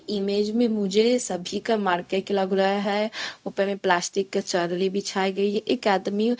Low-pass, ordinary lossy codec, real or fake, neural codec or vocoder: none; none; fake; codec, 16 kHz, 0.4 kbps, LongCat-Audio-Codec